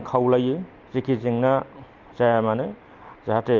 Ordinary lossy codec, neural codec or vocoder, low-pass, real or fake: Opus, 32 kbps; none; 7.2 kHz; real